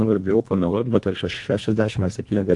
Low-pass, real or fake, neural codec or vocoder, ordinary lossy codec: 10.8 kHz; fake; codec, 24 kHz, 1.5 kbps, HILCodec; AAC, 64 kbps